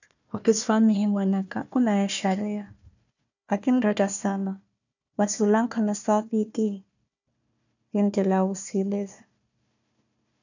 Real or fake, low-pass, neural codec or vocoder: fake; 7.2 kHz; codec, 16 kHz, 1 kbps, FunCodec, trained on Chinese and English, 50 frames a second